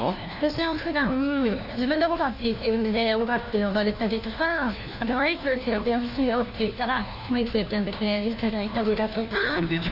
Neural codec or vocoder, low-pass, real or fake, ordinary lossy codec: codec, 16 kHz, 1 kbps, FunCodec, trained on LibriTTS, 50 frames a second; 5.4 kHz; fake; none